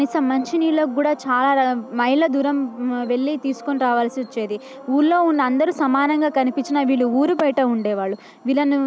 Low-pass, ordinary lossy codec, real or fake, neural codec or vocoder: none; none; real; none